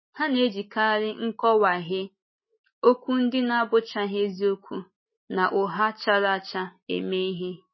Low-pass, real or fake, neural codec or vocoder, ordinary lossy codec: 7.2 kHz; real; none; MP3, 24 kbps